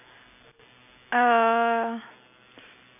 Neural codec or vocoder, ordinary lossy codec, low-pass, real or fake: none; none; 3.6 kHz; real